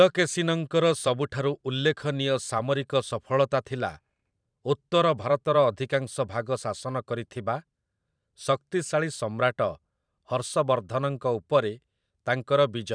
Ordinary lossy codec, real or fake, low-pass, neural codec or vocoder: none; fake; 9.9 kHz; vocoder, 44.1 kHz, 128 mel bands every 512 samples, BigVGAN v2